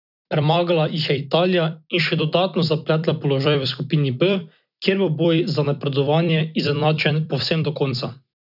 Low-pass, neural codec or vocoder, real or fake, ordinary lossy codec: 5.4 kHz; vocoder, 44.1 kHz, 128 mel bands every 256 samples, BigVGAN v2; fake; none